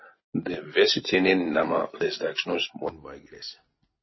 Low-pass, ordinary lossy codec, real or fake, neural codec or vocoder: 7.2 kHz; MP3, 24 kbps; real; none